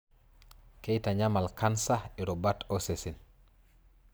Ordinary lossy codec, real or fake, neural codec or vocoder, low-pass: none; real; none; none